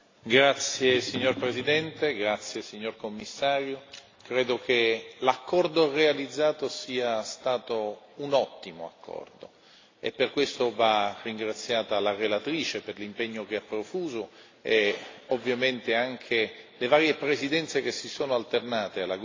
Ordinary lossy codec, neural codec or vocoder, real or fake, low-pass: AAC, 32 kbps; none; real; 7.2 kHz